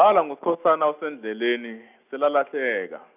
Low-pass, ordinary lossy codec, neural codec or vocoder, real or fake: 3.6 kHz; none; none; real